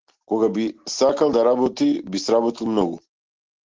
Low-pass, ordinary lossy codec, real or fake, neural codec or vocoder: 7.2 kHz; Opus, 16 kbps; real; none